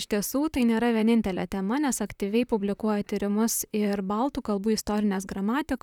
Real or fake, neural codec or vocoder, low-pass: fake; vocoder, 44.1 kHz, 128 mel bands, Pupu-Vocoder; 19.8 kHz